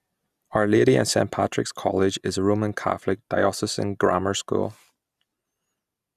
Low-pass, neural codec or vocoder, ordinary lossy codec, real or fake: 14.4 kHz; none; none; real